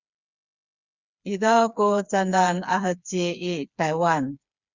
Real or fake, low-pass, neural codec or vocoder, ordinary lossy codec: fake; 7.2 kHz; codec, 16 kHz, 4 kbps, FreqCodec, smaller model; Opus, 64 kbps